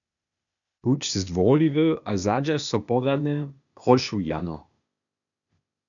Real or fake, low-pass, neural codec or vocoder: fake; 7.2 kHz; codec, 16 kHz, 0.8 kbps, ZipCodec